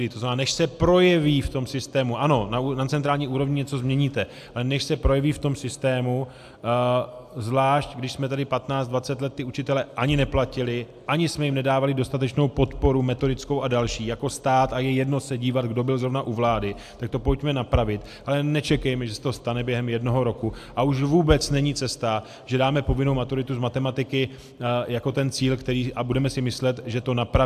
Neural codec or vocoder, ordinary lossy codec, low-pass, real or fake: none; AAC, 96 kbps; 14.4 kHz; real